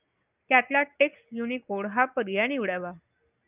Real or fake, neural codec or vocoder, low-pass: real; none; 3.6 kHz